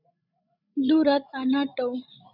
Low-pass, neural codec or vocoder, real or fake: 5.4 kHz; codec, 16 kHz, 16 kbps, FreqCodec, larger model; fake